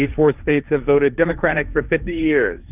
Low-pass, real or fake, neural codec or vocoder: 3.6 kHz; fake; codec, 16 kHz, 1.1 kbps, Voila-Tokenizer